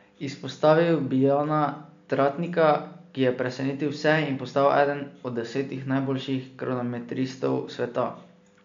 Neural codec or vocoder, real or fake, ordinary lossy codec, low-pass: none; real; MP3, 64 kbps; 7.2 kHz